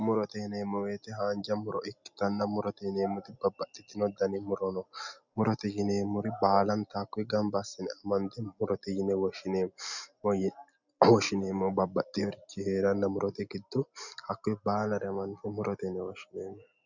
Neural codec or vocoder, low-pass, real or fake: none; 7.2 kHz; real